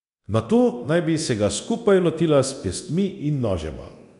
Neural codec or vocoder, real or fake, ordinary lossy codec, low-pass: codec, 24 kHz, 0.9 kbps, DualCodec; fake; none; 10.8 kHz